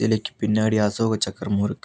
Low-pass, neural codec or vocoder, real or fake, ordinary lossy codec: none; none; real; none